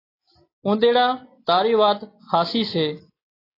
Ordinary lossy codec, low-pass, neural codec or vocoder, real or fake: AAC, 24 kbps; 5.4 kHz; none; real